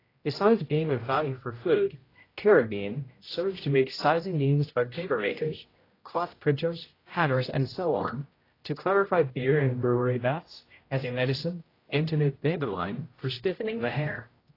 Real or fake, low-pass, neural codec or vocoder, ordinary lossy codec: fake; 5.4 kHz; codec, 16 kHz, 0.5 kbps, X-Codec, HuBERT features, trained on general audio; AAC, 24 kbps